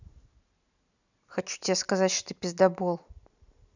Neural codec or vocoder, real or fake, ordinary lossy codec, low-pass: none; real; none; 7.2 kHz